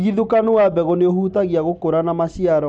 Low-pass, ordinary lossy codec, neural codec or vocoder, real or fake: none; none; none; real